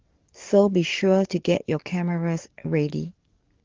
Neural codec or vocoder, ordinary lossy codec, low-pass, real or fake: codec, 44.1 kHz, 7.8 kbps, DAC; Opus, 16 kbps; 7.2 kHz; fake